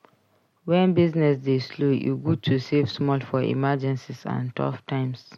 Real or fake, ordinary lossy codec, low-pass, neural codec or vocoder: real; MP3, 64 kbps; 19.8 kHz; none